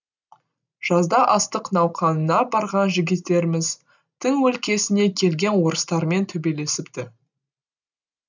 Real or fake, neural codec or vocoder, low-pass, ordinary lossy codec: real; none; 7.2 kHz; none